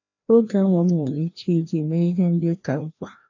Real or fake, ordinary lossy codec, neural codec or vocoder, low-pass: fake; none; codec, 16 kHz, 1 kbps, FreqCodec, larger model; 7.2 kHz